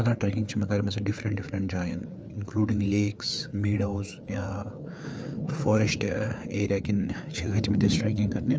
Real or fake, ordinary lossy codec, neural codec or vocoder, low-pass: fake; none; codec, 16 kHz, 4 kbps, FreqCodec, larger model; none